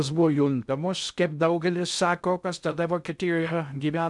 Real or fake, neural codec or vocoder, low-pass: fake; codec, 16 kHz in and 24 kHz out, 0.6 kbps, FocalCodec, streaming, 2048 codes; 10.8 kHz